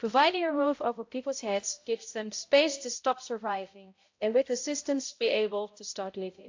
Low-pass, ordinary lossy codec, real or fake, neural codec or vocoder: 7.2 kHz; AAC, 48 kbps; fake; codec, 16 kHz, 0.5 kbps, X-Codec, HuBERT features, trained on balanced general audio